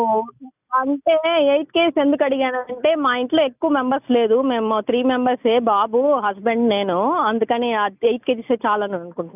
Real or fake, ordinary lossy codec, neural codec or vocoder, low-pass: real; none; none; 3.6 kHz